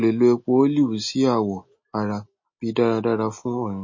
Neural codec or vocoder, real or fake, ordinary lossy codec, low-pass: none; real; MP3, 32 kbps; 7.2 kHz